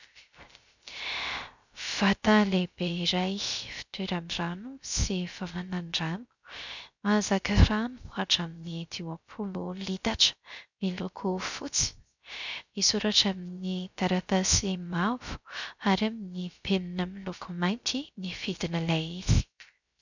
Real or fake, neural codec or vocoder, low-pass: fake; codec, 16 kHz, 0.3 kbps, FocalCodec; 7.2 kHz